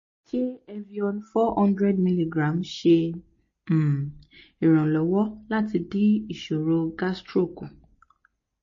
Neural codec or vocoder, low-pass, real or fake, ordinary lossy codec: none; 7.2 kHz; real; MP3, 32 kbps